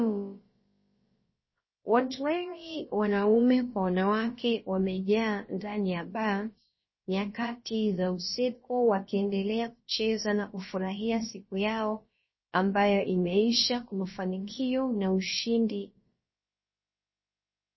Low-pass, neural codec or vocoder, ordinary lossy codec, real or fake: 7.2 kHz; codec, 16 kHz, about 1 kbps, DyCAST, with the encoder's durations; MP3, 24 kbps; fake